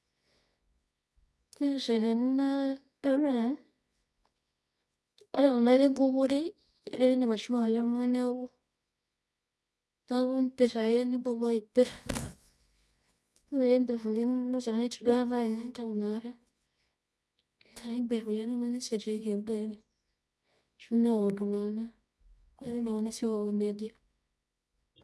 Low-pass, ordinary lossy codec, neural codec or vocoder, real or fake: none; none; codec, 24 kHz, 0.9 kbps, WavTokenizer, medium music audio release; fake